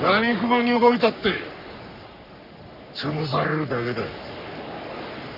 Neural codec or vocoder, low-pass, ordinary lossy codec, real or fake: codec, 44.1 kHz, 3.4 kbps, Pupu-Codec; 5.4 kHz; none; fake